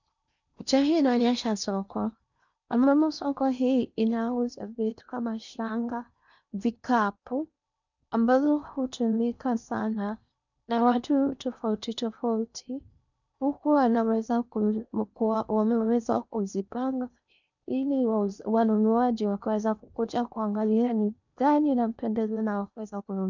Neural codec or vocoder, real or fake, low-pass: codec, 16 kHz in and 24 kHz out, 0.8 kbps, FocalCodec, streaming, 65536 codes; fake; 7.2 kHz